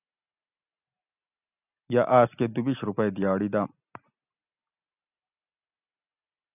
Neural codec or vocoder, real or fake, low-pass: none; real; 3.6 kHz